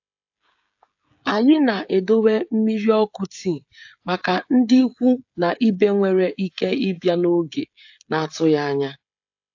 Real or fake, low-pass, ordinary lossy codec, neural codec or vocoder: fake; 7.2 kHz; AAC, 48 kbps; codec, 16 kHz, 16 kbps, FreqCodec, smaller model